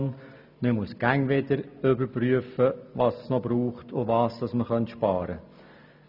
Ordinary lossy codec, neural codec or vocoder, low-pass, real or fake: none; none; 5.4 kHz; real